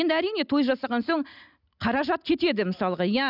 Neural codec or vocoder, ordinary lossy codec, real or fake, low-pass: none; none; real; 5.4 kHz